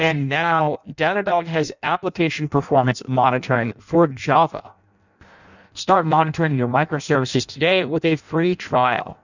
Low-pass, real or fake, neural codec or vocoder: 7.2 kHz; fake; codec, 16 kHz in and 24 kHz out, 0.6 kbps, FireRedTTS-2 codec